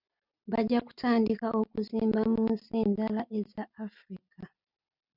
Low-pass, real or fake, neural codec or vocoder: 5.4 kHz; real; none